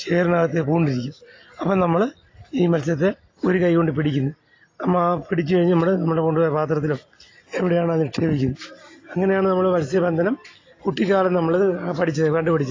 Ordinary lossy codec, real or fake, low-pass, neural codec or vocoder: AAC, 32 kbps; real; 7.2 kHz; none